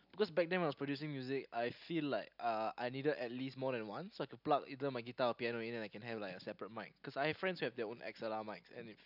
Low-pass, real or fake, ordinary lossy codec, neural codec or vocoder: 5.4 kHz; real; none; none